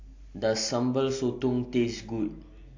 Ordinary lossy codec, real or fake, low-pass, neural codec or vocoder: AAC, 48 kbps; real; 7.2 kHz; none